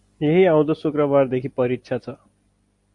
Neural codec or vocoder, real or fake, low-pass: none; real; 10.8 kHz